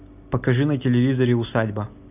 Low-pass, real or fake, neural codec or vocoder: 3.6 kHz; real; none